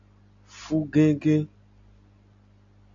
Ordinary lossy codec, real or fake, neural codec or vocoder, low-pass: AAC, 48 kbps; real; none; 7.2 kHz